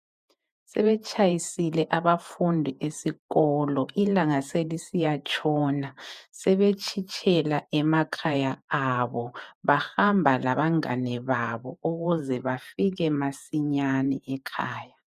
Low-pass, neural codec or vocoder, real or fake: 14.4 kHz; vocoder, 48 kHz, 128 mel bands, Vocos; fake